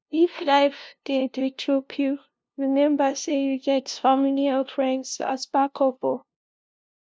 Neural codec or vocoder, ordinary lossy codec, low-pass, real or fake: codec, 16 kHz, 0.5 kbps, FunCodec, trained on LibriTTS, 25 frames a second; none; none; fake